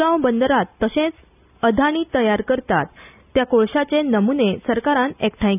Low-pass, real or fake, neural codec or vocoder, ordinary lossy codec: 3.6 kHz; real; none; none